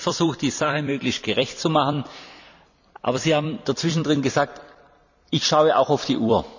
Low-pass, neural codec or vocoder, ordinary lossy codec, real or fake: 7.2 kHz; vocoder, 44.1 kHz, 128 mel bands every 256 samples, BigVGAN v2; none; fake